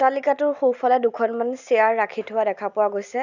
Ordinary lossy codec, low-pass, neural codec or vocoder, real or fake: none; 7.2 kHz; none; real